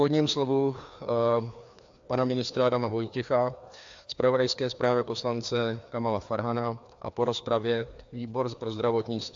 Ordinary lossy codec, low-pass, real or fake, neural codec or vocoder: AAC, 64 kbps; 7.2 kHz; fake; codec, 16 kHz, 2 kbps, FreqCodec, larger model